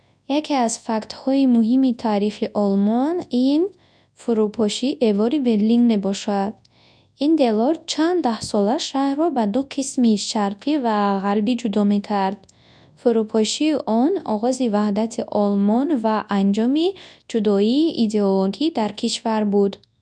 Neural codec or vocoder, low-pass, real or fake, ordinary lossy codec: codec, 24 kHz, 0.9 kbps, WavTokenizer, large speech release; 9.9 kHz; fake; none